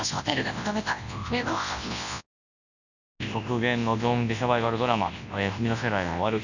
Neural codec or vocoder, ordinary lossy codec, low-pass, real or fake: codec, 24 kHz, 0.9 kbps, WavTokenizer, large speech release; none; 7.2 kHz; fake